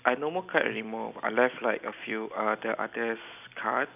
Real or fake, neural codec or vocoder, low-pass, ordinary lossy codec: real; none; 3.6 kHz; none